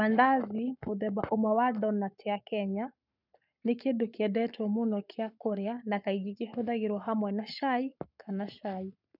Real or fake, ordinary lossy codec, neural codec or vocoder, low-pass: fake; none; codec, 44.1 kHz, 7.8 kbps, Pupu-Codec; 5.4 kHz